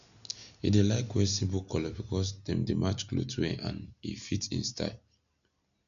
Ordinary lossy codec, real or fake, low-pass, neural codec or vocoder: none; real; 7.2 kHz; none